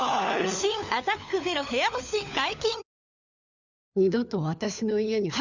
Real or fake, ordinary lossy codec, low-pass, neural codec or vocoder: fake; none; 7.2 kHz; codec, 16 kHz, 4 kbps, FunCodec, trained on LibriTTS, 50 frames a second